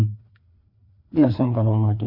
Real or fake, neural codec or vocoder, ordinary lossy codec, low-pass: fake; codec, 16 kHz, 2 kbps, FreqCodec, larger model; MP3, 32 kbps; 5.4 kHz